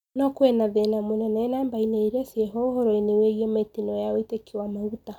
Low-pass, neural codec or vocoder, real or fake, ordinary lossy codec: 19.8 kHz; none; real; none